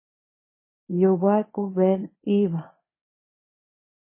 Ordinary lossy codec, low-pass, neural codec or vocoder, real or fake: MP3, 16 kbps; 3.6 kHz; codec, 24 kHz, 0.9 kbps, WavTokenizer, medium speech release version 1; fake